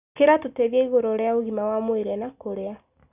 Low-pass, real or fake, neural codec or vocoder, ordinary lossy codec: 3.6 kHz; real; none; none